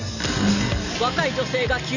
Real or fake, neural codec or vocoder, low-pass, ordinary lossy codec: real; none; 7.2 kHz; none